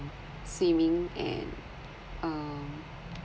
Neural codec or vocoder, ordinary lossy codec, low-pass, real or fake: none; none; none; real